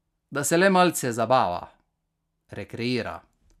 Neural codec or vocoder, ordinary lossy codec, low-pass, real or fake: none; none; 14.4 kHz; real